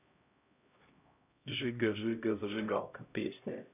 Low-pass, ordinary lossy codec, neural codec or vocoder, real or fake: 3.6 kHz; none; codec, 16 kHz, 0.5 kbps, X-Codec, HuBERT features, trained on LibriSpeech; fake